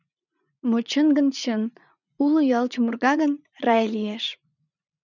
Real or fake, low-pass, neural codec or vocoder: fake; 7.2 kHz; vocoder, 44.1 kHz, 80 mel bands, Vocos